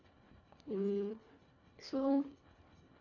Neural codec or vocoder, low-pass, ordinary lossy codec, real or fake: codec, 24 kHz, 1.5 kbps, HILCodec; 7.2 kHz; none; fake